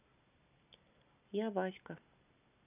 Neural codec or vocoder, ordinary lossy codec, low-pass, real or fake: none; none; 3.6 kHz; real